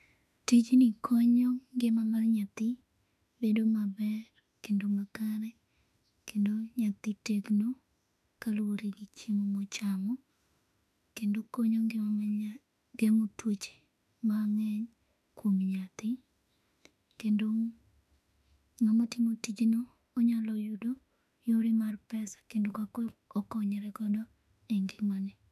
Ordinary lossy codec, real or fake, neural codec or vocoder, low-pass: none; fake; autoencoder, 48 kHz, 32 numbers a frame, DAC-VAE, trained on Japanese speech; 14.4 kHz